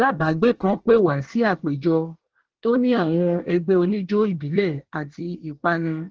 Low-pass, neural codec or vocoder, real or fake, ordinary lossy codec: 7.2 kHz; codec, 24 kHz, 1 kbps, SNAC; fake; Opus, 16 kbps